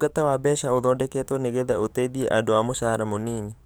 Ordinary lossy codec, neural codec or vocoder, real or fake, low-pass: none; codec, 44.1 kHz, 7.8 kbps, DAC; fake; none